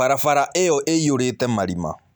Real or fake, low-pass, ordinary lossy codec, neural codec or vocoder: real; none; none; none